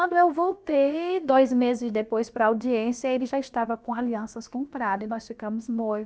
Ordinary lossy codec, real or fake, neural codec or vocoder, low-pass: none; fake; codec, 16 kHz, about 1 kbps, DyCAST, with the encoder's durations; none